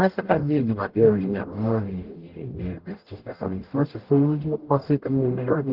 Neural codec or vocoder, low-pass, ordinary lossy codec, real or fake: codec, 44.1 kHz, 0.9 kbps, DAC; 5.4 kHz; Opus, 16 kbps; fake